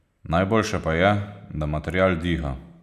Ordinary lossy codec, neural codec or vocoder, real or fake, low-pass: none; none; real; 14.4 kHz